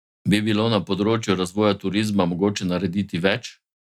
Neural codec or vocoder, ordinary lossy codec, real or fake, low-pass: none; none; real; 19.8 kHz